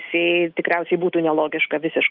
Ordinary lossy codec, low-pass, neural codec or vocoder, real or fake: Opus, 32 kbps; 5.4 kHz; none; real